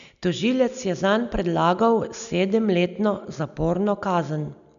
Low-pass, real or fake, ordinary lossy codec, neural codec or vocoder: 7.2 kHz; real; none; none